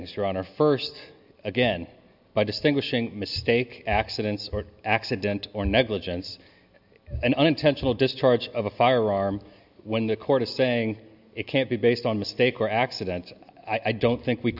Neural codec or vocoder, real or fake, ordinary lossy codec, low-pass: none; real; AAC, 48 kbps; 5.4 kHz